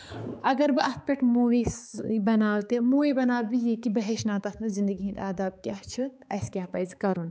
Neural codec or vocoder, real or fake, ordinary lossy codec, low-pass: codec, 16 kHz, 4 kbps, X-Codec, HuBERT features, trained on balanced general audio; fake; none; none